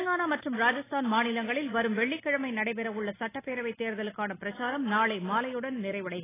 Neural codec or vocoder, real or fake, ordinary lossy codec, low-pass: none; real; AAC, 16 kbps; 3.6 kHz